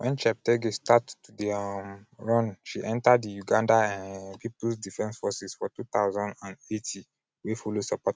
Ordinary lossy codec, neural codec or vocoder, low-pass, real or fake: none; none; none; real